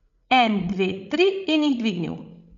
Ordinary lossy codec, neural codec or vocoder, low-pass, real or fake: none; codec, 16 kHz, 16 kbps, FreqCodec, larger model; 7.2 kHz; fake